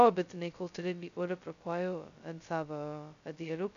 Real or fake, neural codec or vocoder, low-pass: fake; codec, 16 kHz, 0.2 kbps, FocalCodec; 7.2 kHz